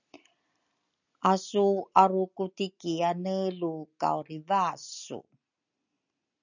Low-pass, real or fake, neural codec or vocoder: 7.2 kHz; real; none